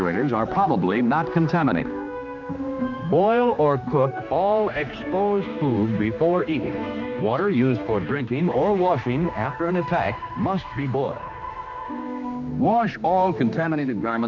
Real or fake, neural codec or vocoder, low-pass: fake; codec, 16 kHz, 2 kbps, X-Codec, HuBERT features, trained on general audio; 7.2 kHz